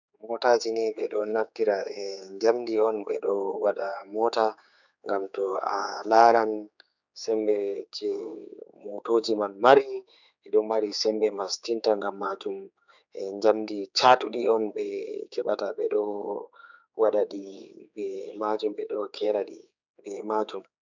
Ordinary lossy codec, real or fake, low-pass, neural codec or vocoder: none; fake; 7.2 kHz; codec, 16 kHz, 4 kbps, X-Codec, HuBERT features, trained on general audio